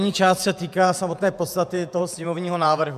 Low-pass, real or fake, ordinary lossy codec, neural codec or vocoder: 14.4 kHz; fake; MP3, 96 kbps; vocoder, 48 kHz, 128 mel bands, Vocos